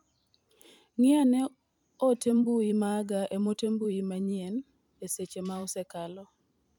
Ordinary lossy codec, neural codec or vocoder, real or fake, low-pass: MP3, 96 kbps; vocoder, 44.1 kHz, 128 mel bands every 512 samples, BigVGAN v2; fake; 19.8 kHz